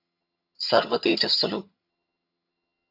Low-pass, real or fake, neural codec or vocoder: 5.4 kHz; fake; vocoder, 22.05 kHz, 80 mel bands, HiFi-GAN